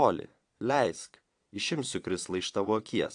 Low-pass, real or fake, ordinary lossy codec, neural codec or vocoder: 9.9 kHz; fake; AAC, 64 kbps; vocoder, 22.05 kHz, 80 mel bands, Vocos